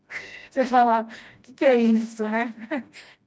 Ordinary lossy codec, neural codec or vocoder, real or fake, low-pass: none; codec, 16 kHz, 1 kbps, FreqCodec, smaller model; fake; none